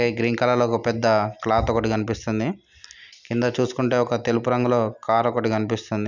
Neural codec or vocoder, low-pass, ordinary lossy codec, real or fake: none; 7.2 kHz; none; real